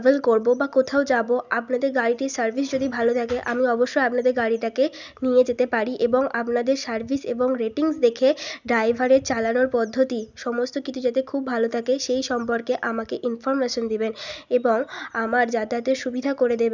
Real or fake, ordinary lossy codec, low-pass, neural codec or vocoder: real; none; 7.2 kHz; none